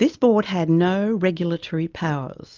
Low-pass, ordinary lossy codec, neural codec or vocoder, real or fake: 7.2 kHz; Opus, 24 kbps; none; real